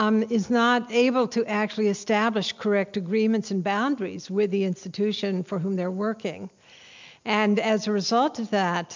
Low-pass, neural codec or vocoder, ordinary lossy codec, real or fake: 7.2 kHz; none; MP3, 64 kbps; real